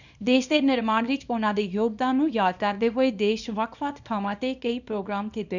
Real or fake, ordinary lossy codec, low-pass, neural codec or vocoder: fake; none; 7.2 kHz; codec, 24 kHz, 0.9 kbps, WavTokenizer, small release